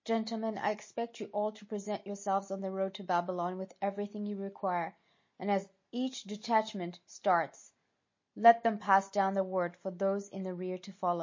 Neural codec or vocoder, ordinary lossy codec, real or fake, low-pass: none; MP3, 32 kbps; real; 7.2 kHz